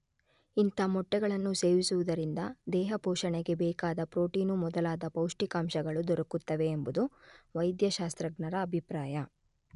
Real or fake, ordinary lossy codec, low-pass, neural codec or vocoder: real; none; 10.8 kHz; none